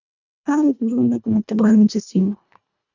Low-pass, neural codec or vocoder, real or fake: 7.2 kHz; codec, 24 kHz, 1.5 kbps, HILCodec; fake